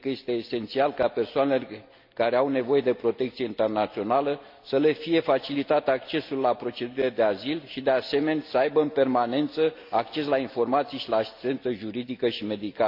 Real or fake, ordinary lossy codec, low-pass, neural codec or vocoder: real; MP3, 48 kbps; 5.4 kHz; none